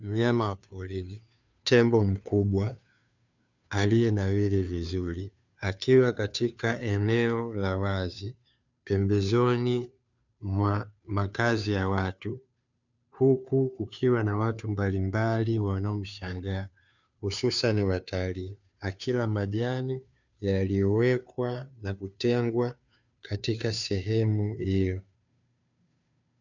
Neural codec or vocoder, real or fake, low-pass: codec, 16 kHz, 2 kbps, FunCodec, trained on Chinese and English, 25 frames a second; fake; 7.2 kHz